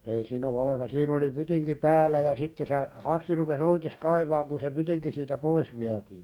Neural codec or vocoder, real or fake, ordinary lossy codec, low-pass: codec, 44.1 kHz, 2.6 kbps, DAC; fake; none; 19.8 kHz